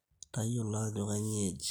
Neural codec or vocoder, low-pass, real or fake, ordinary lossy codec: none; none; real; none